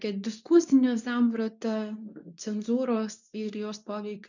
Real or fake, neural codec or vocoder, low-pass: fake; codec, 24 kHz, 0.9 kbps, WavTokenizer, medium speech release version 2; 7.2 kHz